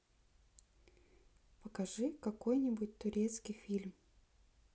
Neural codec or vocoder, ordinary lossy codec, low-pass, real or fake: none; none; none; real